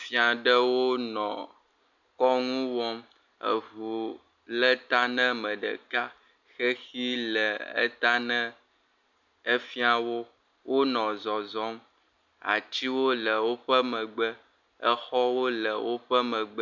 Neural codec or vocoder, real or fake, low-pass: none; real; 7.2 kHz